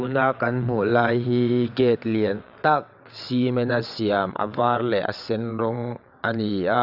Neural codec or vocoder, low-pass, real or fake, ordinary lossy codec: vocoder, 22.05 kHz, 80 mel bands, WaveNeXt; 5.4 kHz; fake; none